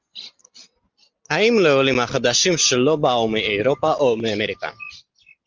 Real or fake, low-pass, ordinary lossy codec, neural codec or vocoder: real; 7.2 kHz; Opus, 24 kbps; none